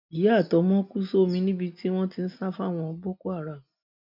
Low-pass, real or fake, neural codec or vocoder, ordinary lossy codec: 5.4 kHz; real; none; MP3, 48 kbps